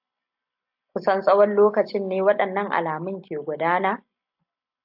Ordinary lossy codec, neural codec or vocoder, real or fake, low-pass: AAC, 48 kbps; none; real; 5.4 kHz